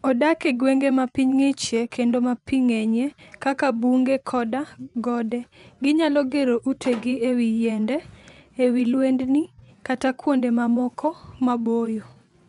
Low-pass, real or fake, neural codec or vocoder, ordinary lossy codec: 10.8 kHz; fake; vocoder, 24 kHz, 100 mel bands, Vocos; none